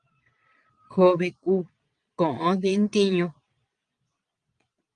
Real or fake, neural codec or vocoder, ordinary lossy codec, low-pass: fake; vocoder, 22.05 kHz, 80 mel bands, Vocos; Opus, 32 kbps; 9.9 kHz